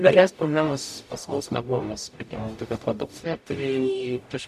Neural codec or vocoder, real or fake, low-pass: codec, 44.1 kHz, 0.9 kbps, DAC; fake; 14.4 kHz